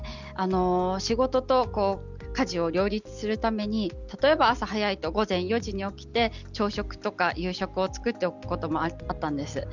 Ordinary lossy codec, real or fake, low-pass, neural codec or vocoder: none; real; 7.2 kHz; none